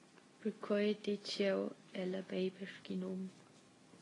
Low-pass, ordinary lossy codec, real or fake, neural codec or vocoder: 10.8 kHz; AAC, 32 kbps; real; none